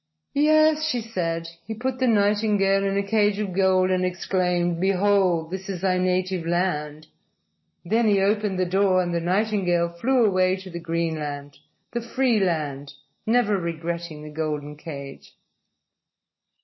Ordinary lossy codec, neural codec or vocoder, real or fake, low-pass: MP3, 24 kbps; none; real; 7.2 kHz